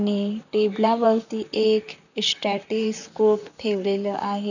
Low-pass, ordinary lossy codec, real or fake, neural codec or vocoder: 7.2 kHz; none; fake; vocoder, 44.1 kHz, 128 mel bands, Pupu-Vocoder